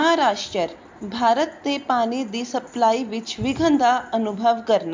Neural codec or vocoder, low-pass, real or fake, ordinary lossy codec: none; 7.2 kHz; real; MP3, 64 kbps